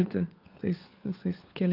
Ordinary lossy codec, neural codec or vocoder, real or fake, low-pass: Opus, 24 kbps; codec, 16 kHz, 4 kbps, FunCodec, trained on LibriTTS, 50 frames a second; fake; 5.4 kHz